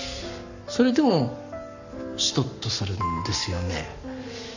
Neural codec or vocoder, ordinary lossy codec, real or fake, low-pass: codec, 44.1 kHz, 7.8 kbps, Pupu-Codec; none; fake; 7.2 kHz